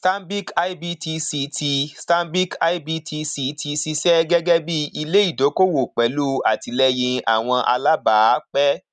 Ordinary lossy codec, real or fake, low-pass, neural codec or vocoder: none; real; 10.8 kHz; none